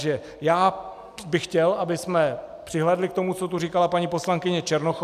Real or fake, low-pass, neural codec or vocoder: real; 14.4 kHz; none